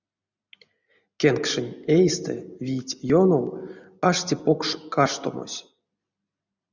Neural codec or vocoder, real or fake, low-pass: none; real; 7.2 kHz